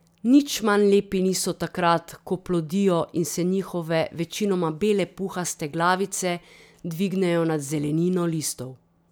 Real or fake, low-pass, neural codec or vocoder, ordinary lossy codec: real; none; none; none